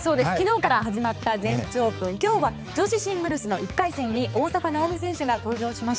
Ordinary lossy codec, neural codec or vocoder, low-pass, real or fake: none; codec, 16 kHz, 4 kbps, X-Codec, HuBERT features, trained on balanced general audio; none; fake